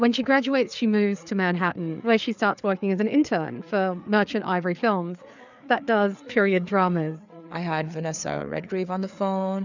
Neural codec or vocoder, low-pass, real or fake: codec, 16 kHz, 4 kbps, FreqCodec, larger model; 7.2 kHz; fake